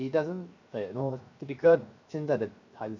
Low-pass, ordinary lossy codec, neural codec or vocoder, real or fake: 7.2 kHz; none; codec, 16 kHz, 0.7 kbps, FocalCodec; fake